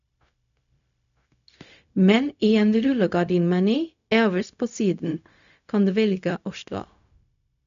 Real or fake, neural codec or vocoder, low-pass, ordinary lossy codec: fake; codec, 16 kHz, 0.4 kbps, LongCat-Audio-Codec; 7.2 kHz; none